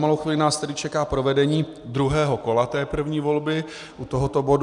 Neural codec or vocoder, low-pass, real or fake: none; 10.8 kHz; real